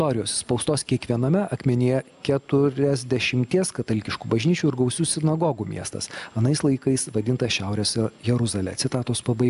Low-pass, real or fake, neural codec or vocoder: 10.8 kHz; real; none